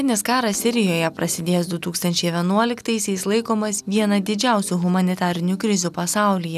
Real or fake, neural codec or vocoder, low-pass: real; none; 14.4 kHz